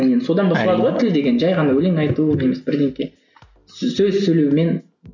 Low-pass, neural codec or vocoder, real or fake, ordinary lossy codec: 7.2 kHz; none; real; none